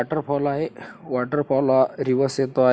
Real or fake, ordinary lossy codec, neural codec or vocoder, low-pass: real; none; none; none